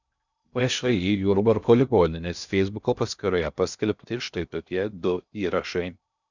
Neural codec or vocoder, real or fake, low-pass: codec, 16 kHz in and 24 kHz out, 0.6 kbps, FocalCodec, streaming, 2048 codes; fake; 7.2 kHz